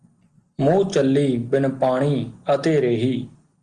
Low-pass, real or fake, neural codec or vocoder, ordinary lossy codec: 9.9 kHz; real; none; Opus, 24 kbps